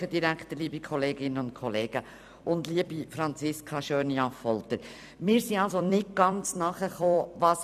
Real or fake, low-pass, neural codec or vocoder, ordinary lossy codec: fake; 14.4 kHz; vocoder, 44.1 kHz, 128 mel bands every 256 samples, BigVGAN v2; none